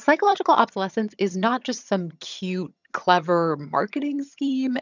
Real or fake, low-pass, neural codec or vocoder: fake; 7.2 kHz; vocoder, 22.05 kHz, 80 mel bands, HiFi-GAN